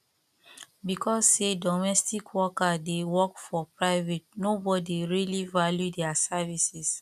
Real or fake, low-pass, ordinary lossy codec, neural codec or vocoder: real; 14.4 kHz; none; none